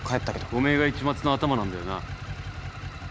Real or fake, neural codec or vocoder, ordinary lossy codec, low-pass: real; none; none; none